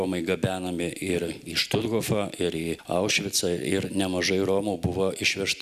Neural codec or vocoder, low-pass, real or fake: none; 14.4 kHz; real